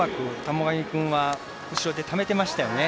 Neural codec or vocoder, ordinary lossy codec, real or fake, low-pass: none; none; real; none